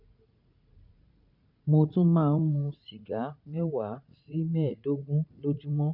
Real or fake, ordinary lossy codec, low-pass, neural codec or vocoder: fake; MP3, 48 kbps; 5.4 kHz; vocoder, 44.1 kHz, 80 mel bands, Vocos